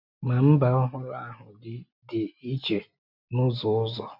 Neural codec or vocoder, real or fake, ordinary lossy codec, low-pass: none; real; none; 5.4 kHz